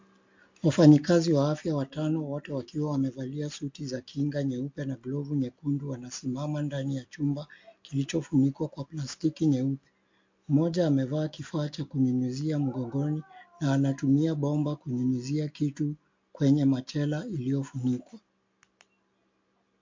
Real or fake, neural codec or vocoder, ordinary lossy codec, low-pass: real; none; MP3, 48 kbps; 7.2 kHz